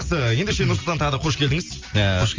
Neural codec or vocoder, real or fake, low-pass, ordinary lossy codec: none; real; 7.2 kHz; Opus, 32 kbps